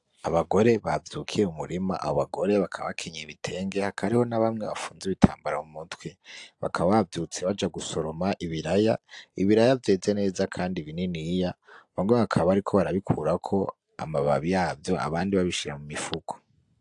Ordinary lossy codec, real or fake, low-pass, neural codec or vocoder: AAC, 64 kbps; real; 10.8 kHz; none